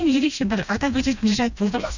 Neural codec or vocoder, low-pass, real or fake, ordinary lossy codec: codec, 16 kHz, 1 kbps, FreqCodec, smaller model; 7.2 kHz; fake; none